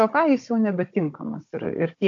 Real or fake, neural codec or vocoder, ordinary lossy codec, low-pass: fake; codec, 16 kHz, 16 kbps, FunCodec, trained on Chinese and English, 50 frames a second; AAC, 48 kbps; 7.2 kHz